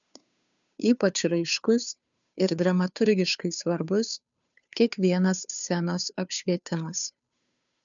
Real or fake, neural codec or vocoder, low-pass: fake; codec, 16 kHz, 2 kbps, FunCodec, trained on Chinese and English, 25 frames a second; 7.2 kHz